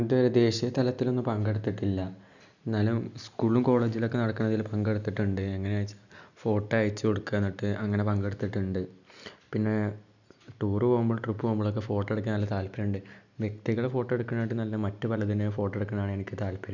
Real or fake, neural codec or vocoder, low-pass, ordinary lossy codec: real; none; 7.2 kHz; none